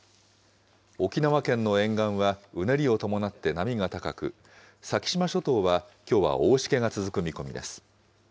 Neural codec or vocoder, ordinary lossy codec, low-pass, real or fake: none; none; none; real